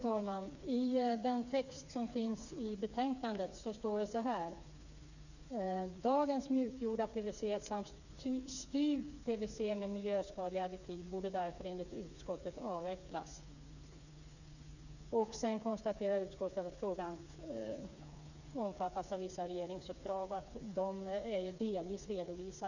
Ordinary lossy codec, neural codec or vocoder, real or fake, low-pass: none; codec, 16 kHz, 4 kbps, FreqCodec, smaller model; fake; 7.2 kHz